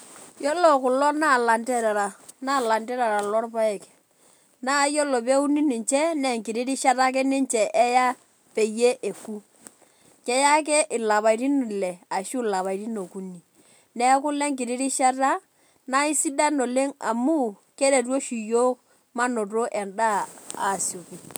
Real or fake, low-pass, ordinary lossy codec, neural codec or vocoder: real; none; none; none